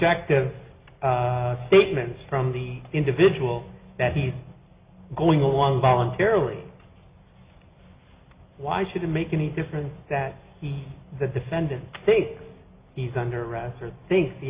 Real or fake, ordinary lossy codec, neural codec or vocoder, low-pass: real; Opus, 64 kbps; none; 3.6 kHz